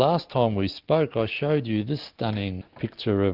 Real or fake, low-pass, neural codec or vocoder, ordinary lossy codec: real; 5.4 kHz; none; Opus, 32 kbps